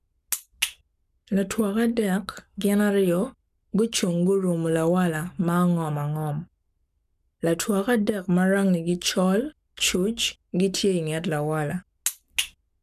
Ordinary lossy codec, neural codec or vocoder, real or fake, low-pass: none; codec, 44.1 kHz, 7.8 kbps, Pupu-Codec; fake; 14.4 kHz